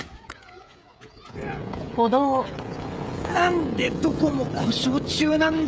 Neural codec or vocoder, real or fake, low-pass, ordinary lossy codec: codec, 16 kHz, 16 kbps, FreqCodec, smaller model; fake; none; none